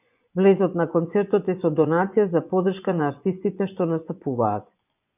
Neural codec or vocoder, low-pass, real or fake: vocoder, 24 kHz, 100 mel bands, Vocos; 3.6 kHz; fake